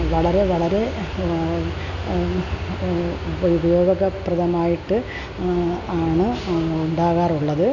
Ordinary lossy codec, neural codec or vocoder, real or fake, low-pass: none; none; real; 7.2 kHz